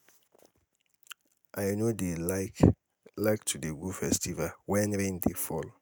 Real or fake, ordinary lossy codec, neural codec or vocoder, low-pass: real; none; none; none